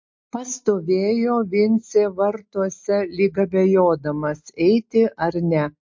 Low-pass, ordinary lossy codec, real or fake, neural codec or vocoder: 7.2 kHz; MP3, 48 kbps; real; none